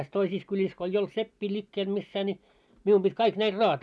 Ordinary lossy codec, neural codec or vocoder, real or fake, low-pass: none; none; real; none